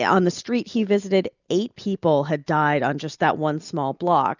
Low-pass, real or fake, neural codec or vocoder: 7.2 kHz; real; none